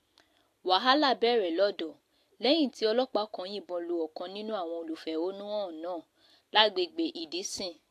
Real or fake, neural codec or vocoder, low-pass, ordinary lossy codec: real; none; 14.4 kHz; AAC, 64 kbps